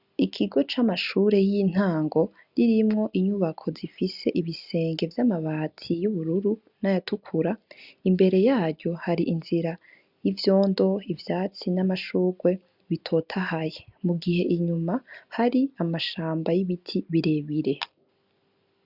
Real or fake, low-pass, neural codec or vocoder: real; 5.4 kHz; none